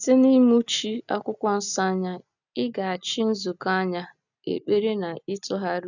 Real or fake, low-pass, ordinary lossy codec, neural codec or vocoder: real; 7.2 kHz; none; none